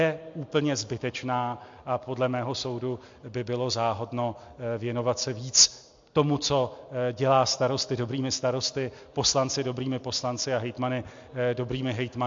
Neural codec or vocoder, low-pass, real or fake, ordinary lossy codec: none; 7.2 kHz; real; MP3, 48 kbps